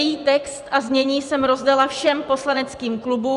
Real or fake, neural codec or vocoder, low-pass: fake; vocoder, 24 kHz, 100 mel bands, Vocos; 10.8 kHz